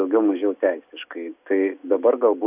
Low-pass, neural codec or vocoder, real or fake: 3.6 kHz; none; real